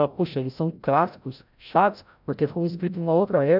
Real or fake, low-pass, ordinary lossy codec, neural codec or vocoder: fake; 5.4 kHz; none; codec, 16 kHz, 0.5 kbps, FreqCodec, larger model